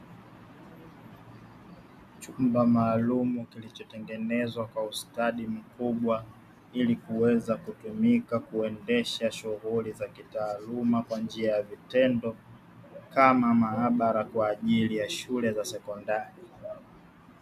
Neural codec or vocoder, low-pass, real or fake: none; 14.4 kHz; real